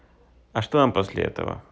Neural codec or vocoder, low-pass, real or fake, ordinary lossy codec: none; none; real; none